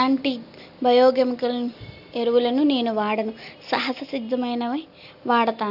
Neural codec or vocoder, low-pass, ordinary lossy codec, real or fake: none; 5.4 kHz; AAC, 48 kbps; real